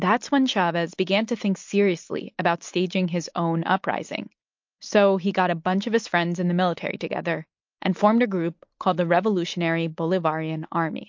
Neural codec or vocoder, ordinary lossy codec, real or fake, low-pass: none; MP3, 48 kbps; real; 7.2 kHz